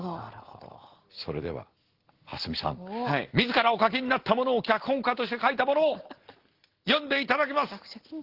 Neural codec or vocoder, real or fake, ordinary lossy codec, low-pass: none; real; Opus, 16 kbps; 5.4 kHz